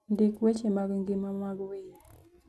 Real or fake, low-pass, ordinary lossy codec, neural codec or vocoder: real; none; none; none